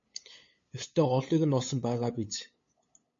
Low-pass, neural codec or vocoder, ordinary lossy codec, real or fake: 7.2 kHz; codec, 16 kHz, 8 kbps, FunCodec, trained on LibriTTS, 25 frames a second; MP3, 32 kbps; fake